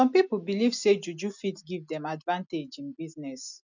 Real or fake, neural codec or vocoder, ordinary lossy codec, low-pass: real; none; none; 7.2 kHz